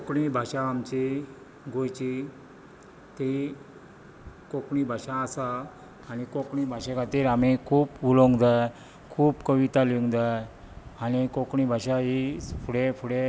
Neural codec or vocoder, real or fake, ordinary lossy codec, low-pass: none; real; none; none